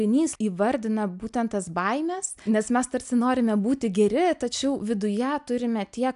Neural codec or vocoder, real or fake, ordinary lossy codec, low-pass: none; real; AAC, 96 kbps; 10.8 kHz